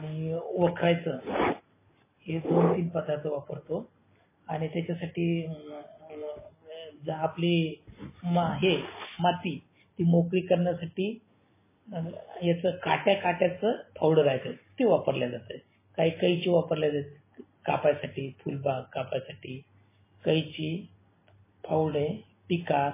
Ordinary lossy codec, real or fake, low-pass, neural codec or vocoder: MP3, 16 kbps; real; 3.6 kHz; none